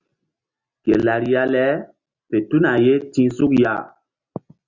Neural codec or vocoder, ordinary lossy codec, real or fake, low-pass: none; Opus, 64 kbps; real; 7.2 kHz